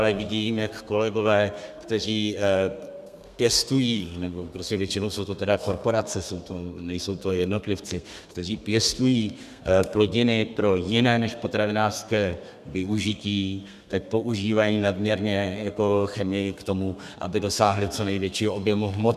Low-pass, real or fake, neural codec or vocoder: 14.4 kHz; fake; codec, 32 kHz, 1.9 kbps, SNAC